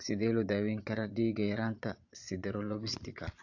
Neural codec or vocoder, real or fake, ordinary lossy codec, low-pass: vocoder, 22.05 kHz, 80 mel bands, Vocos; fake; AAC, 48 kbps; 7.2 kHz